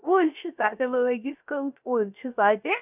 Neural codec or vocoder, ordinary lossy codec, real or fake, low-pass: codec, 16 kHz, 0.3 kbps, FocalCodec; none; fake; 3.6 kHz